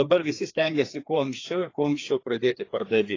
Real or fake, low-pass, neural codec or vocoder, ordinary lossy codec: fake; 7.2 kHz; codec, 16 kHz, 2 kbps, FreqCodec, larger model; AAC, 32 kbps